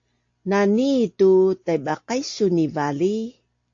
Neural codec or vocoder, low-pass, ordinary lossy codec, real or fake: none; 7.2 kHz; AAC, 48 kbps; real